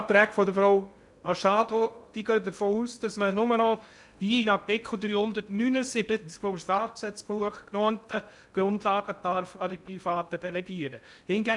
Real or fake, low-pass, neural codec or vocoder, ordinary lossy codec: fake; 10.8 kHz; codec, 16 kHz in and 24 kHz out, 0.6 kbps, FocalCodec, streaming, 2048 codes; none